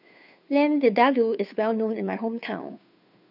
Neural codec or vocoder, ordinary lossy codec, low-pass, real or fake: codec, 16 kHz, 2 kbps, FunCodec, trained on Chinese and English, 25 frames a second; none; 5.4 kHz; fake